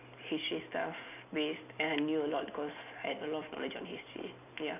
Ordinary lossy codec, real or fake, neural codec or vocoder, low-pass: none; real; none; 3.6 kHz